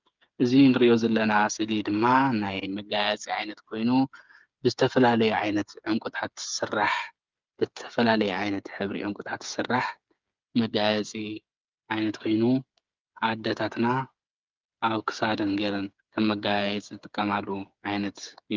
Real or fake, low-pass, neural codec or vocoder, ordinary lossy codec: fake; 7.2 kHz; codec, 16 kHz, 8 kbps, FreqCodec, smaller model; Opus, 24 kbps